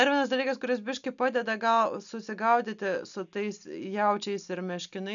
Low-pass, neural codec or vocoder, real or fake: 7.2 kHz; none; real